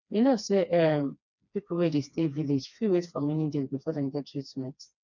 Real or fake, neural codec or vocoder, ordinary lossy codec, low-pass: fake; codec, 16 kHz, 2 kbps, FreqCodec, smaller model; none; 7.2 kHz